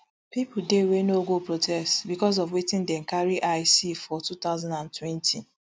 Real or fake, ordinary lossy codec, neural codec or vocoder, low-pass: real; none; none; none